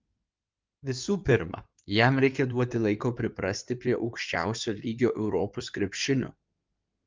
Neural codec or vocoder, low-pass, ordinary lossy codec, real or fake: codec, 16 kHz in and 24 kHz out, 2.2 kbps, FireRedTTS-2 codec; 7.2 kHz; Opus, 24 kbps; fake